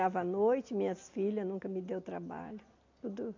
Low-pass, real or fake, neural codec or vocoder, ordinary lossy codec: 7.2 kHz; real; none; MP3, 48 kbps